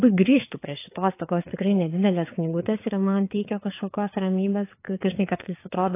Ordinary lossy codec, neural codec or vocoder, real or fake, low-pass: AAC, 24 kbps; codec, 16 kHz, 4 kbps, FreqCodec, larger model; fake; 3.6 kHz